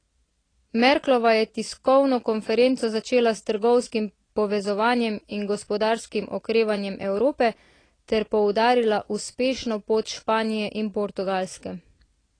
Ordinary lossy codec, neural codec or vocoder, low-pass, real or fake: AAC, 32 kbps; none; 9.9 kHz; real